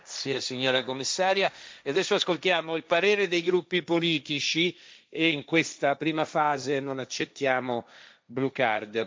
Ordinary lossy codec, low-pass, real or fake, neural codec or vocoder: none; none; fake; codec, 16 kHz, 1.1 kbps, Voila-Tokenizer